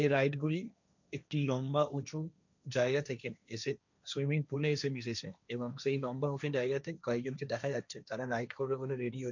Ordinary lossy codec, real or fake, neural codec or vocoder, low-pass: none; fake; codec, 16 kHz, 1.1 kbps, Voila-Tokenizer; 7.2 kHz